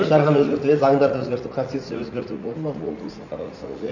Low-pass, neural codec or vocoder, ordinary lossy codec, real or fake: 7.2 kHz; vocoder, 44.1 kHz, 80 mel bands, Vocos; none; fake